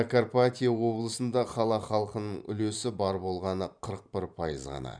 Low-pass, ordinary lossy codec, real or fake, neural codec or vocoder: 9.9 kHz; none; fake; vocoder, 44.1 kHz, 128 mel bands every 512 samples, BigVGAN v2